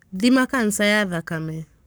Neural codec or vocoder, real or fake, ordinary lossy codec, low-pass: codec, 44.1 kHz, 7.8 kbps, Pupu-Codec; fake; none; none